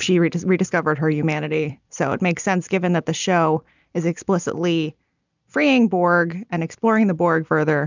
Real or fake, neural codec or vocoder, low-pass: real; none; 7.2 kHz